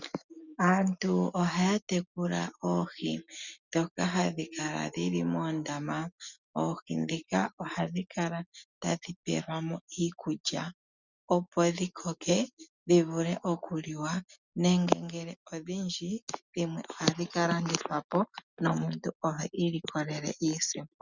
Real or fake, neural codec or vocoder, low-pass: real; none; 7.2 kHz